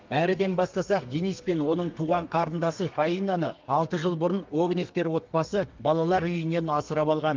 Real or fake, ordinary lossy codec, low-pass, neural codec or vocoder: fake; Opus, 24 kbps; 7.2 kHz; codec, 32 kHz, 1.9 kbps, SNAC